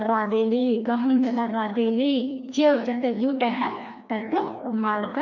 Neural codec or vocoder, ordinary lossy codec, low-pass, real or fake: codec, 16 kHz, 1 kbps, FreqCodec, larger model; none; 7.2 kHz; fake